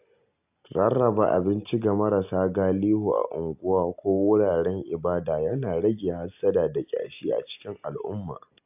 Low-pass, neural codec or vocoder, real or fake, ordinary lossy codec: 3.6 kHz; none; real; AAC, 32 kbps